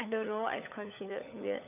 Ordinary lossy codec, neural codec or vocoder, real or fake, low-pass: none; codec, 16 kHz, 16 kbps, FunCodec, trained on LibriTTS, 50 frames a second; fake; 3.6 kHz